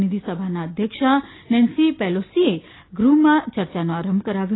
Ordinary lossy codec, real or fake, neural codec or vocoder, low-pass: AAC, 16 kbps; real; none; 7.2 kHz